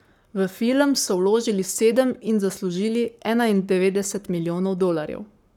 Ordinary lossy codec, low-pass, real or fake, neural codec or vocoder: none; 19.8 kHz; fake; codec, 44.1 kHz, 7.8 kbps, Pupu-Codec